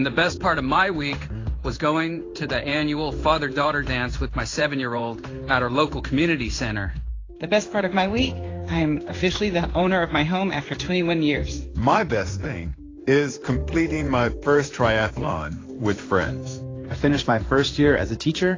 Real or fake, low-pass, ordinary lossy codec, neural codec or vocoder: fake; 7.2 kHz; AAC, 32 kbps; codec, 16 kHz in and 24 kHz out, 1 kbps, XY-Tokenizer